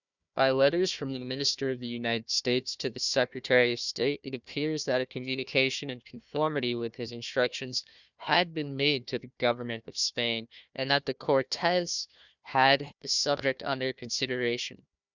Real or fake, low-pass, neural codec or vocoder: fake; 7.2 kHz; codec, 16 kHz, 1 kbps, FunCodec, trained on Chinese and English, 50 frames a second